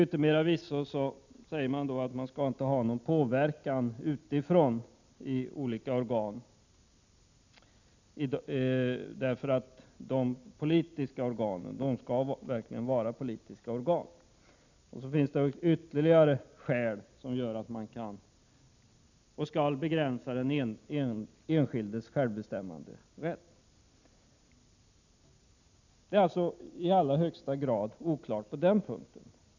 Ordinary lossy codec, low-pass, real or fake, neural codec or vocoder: none; 7.2 kHz; real; none